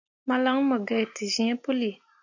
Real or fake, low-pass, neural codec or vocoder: real; 7.2 kHz; none